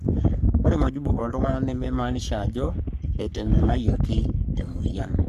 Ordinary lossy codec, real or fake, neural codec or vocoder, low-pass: MP3, 96 kbps; fake; codec, 44.1 kHz, 3.4 kbps, Pupu-Codec; 14.4 kHz